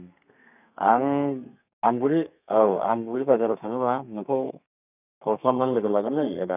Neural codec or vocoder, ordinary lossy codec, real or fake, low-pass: codec, 32 kHz, 1.9 kbps, SNAC; none; fake; 3.6 kHz